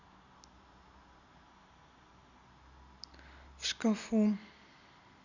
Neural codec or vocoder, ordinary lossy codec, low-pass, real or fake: none; none; 7.2 kHz; real